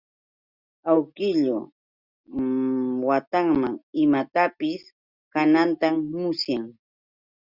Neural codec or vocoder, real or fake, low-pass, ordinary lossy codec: none; real; 5.4 kHz; Opus, 64 kbps